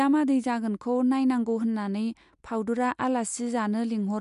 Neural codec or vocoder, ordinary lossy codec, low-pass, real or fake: none; MP3, 64 kbps; 10.8 kHz; real